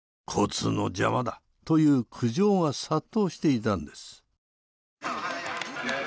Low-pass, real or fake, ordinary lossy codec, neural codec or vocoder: none; real; none; none